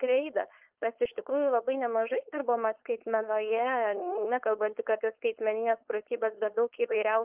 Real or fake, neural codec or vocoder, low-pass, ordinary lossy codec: fake; codec, 16 kHz, 4.8 kbps, FACodec; 3.6 kHz; Opus, 32 kbps